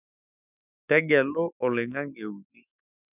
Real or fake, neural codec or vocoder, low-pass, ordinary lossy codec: fake; autoencoder, 48 kHz, 128 numbers a frame, DAC-VAE, trained on Japanese speech; 3.6 kHz; none